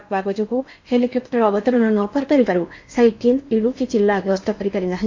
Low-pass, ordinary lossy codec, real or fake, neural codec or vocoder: 7.2 kHz; MP3, 48 kbps; fake; codec, 16 kHz in and 24 kHz out, 0.8 kbps, FocalCodec, streaming, 65536 codes